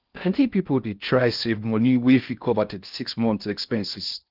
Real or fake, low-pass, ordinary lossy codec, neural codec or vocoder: fake; 5.4 kHz; Opus, 32 kbps; codec, 16 kHz in and 24 kHz out, 0.6 kbps, FocalCodec, streaming, 4096 codes